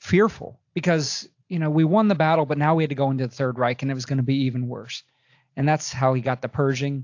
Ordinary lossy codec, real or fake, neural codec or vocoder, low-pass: AAC, 48 kbps; real; none; 7.2 kHz